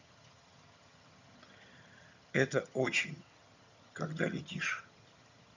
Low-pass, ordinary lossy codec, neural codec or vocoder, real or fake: 7.2 kHz; none; vocoder, 22.05 kHz, 80 mel bands, HiFi-GAN; fake